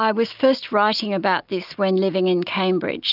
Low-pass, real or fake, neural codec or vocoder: 5.4 kHz; real; none